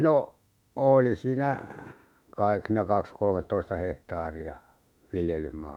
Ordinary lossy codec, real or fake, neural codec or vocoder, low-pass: none; fake; autoencoder, 48 kHz, 32 numbers a frame, DAC-VAE, trained on Japanese speech; 19.8 kHz